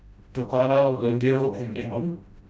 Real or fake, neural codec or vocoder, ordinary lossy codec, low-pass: fake; codec, 16 kHz, 0.5 kbps, FreqCodec, smaller model; none; none